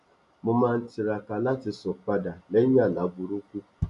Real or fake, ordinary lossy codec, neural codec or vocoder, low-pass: real; none; none; 10.8 kHz